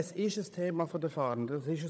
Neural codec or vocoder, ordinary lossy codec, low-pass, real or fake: codec, 16 kHz, 16 kbps, FunCodec, trained on Chinese and English, 50 frames a second; none; none; fake